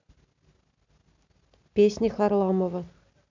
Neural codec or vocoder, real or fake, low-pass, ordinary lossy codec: none; real; 7.2 kHz; MP3, 64 kbps